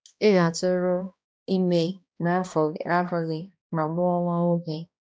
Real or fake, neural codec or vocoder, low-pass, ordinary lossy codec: fake; codec, 16 kHz, 1 kbps, X-Codec, HuBERT features, trained on balanced general audio; none; none